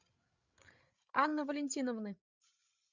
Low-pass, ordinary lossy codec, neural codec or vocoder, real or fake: 7.2 kHz; Opus, 64 kbps; codec, 16 kHz, 8 kbps, FreqCodec, larger model; fake